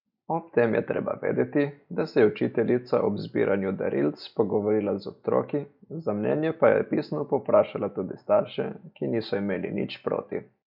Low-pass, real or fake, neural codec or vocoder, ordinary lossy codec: 5.4 kHz; real; none; none